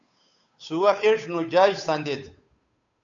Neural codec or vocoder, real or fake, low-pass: codec, 16 kHz, 8 kbps, FunCodec, trained on Chinese and English, 25 frames a second; fake; 7.2 kHz